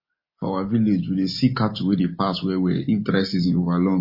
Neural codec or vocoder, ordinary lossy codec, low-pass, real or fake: none; MP3, 24 kbps; 5.4 kHz; real